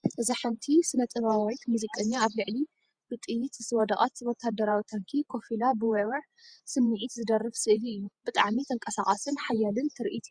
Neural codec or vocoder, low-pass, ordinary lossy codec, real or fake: vocoder, 48 kHz, 128 mel bands, Vocos; 9.9 kHz; Opus, 64 kbps; fake